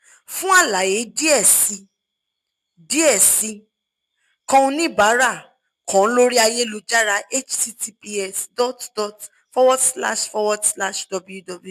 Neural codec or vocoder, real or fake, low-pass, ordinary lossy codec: none; real; 14.4 kHz; MP3, 96 kbps